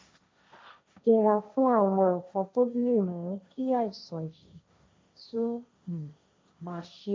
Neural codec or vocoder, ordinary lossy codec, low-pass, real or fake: codec, 16 kHz, 1.1 kbps, Voila-Tokenizer; none; none; fake